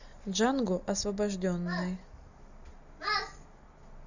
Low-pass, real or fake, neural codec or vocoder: 7.2 kHz; real; none